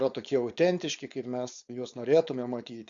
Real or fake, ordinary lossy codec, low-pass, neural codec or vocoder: fake; Opus, 64 kbps; 7.2 kHz; codec, 16 kHz, 4.8 kbps, FACodec